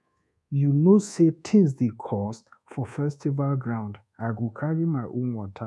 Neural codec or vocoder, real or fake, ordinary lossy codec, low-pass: codec, 24 kHz, 1.2 kbps, DualCodec; fake; none; none